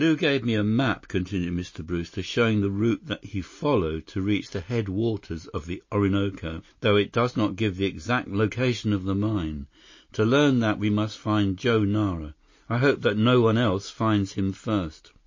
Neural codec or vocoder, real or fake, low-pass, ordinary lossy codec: none; real; 7.2 kHz; MP3, 32 kbps